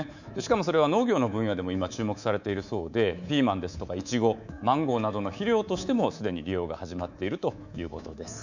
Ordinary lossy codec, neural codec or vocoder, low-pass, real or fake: none; codec, 24 kHz, 3.1 kbps, DualCodec; 7.2 kHz; fake